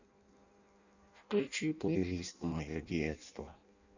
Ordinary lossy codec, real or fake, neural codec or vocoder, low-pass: none; fake; codec, 16 kHz in and 24 kHz out, 0.6 kbps, FireRedTTS-2 codec; 7.2 kHz